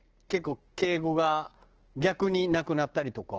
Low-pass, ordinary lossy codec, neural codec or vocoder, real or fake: 7.2 kHz; Opus, 16 kbps; codec, 16 kHz, 6 kbps, DAC; fake